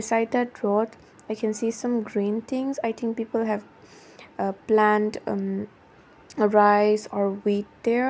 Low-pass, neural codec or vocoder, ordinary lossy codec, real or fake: none; none; none; real